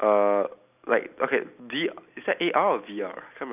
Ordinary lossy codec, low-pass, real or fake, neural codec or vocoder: none; 3.6 kHz; real; none